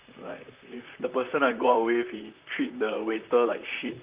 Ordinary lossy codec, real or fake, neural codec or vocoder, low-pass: Opus, 32 kbps; fake; vocoder, 44.1 kHz, 128 mel bands, Pupu-Vocoder; 3.6 kHz